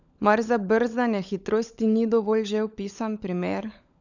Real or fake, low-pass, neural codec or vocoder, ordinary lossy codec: fake; 7.2 kHz; codec, 16 kHz, 16 kbps, FunCodec, trained on LibriTTS, 50 frames a second; none